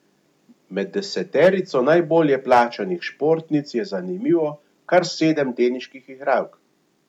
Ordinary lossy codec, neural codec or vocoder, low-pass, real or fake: none; none; 19.8 kHz; real